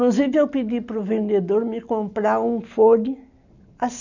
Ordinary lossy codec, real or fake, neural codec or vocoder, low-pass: none; real; none; 7.2 kHz